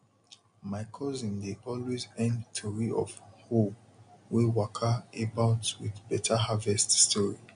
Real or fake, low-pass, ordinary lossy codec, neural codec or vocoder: real; 9.9 kHz; MP3, 64 kbps; none